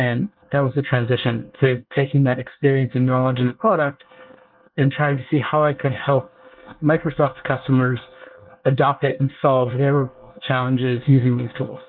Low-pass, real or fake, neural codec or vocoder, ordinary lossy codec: 5.4 kHz; fake; codec, 24 kHz, 1 kbps, SNAC; Opus, 24 kbps